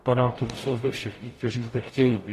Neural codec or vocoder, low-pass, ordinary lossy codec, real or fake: codec, 44.1 kHz, 0.9 kbps, DAC; 14.4 kHz; AAC, 48 kbps; fake